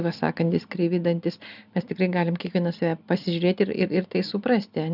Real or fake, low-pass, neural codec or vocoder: real; 5.4 kHz; none